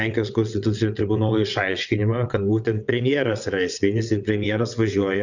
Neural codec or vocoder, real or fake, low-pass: vocoder, 22.05 kHz, 80 mel bands, WaveNeXt; fake; 7.2 kHz